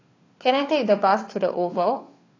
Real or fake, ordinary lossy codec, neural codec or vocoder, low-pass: fake; AAC, 32 kbps; codec, 16 kHz, 2 kbps, FunCodec, trained on Chinese and English, 25 frames a second; 7.2 kHz